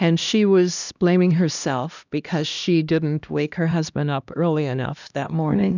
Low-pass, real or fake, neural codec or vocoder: 7.2 kHz; fake; codec, 16 kHz, 1 kbps, X-Codec, HuBERT features, trained on LibriSpeech